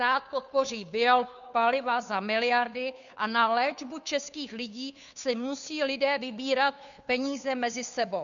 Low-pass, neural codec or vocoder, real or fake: 7.2 kHz; codec, 16 kHz, 2 kbps, FunCodec, trained on Chinese and English, 25 frames a second; fake